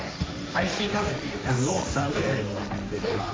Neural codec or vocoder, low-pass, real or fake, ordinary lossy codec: codec, 16 kHz, 1.1 kbps, Voila-Tokenizer; none; fake; none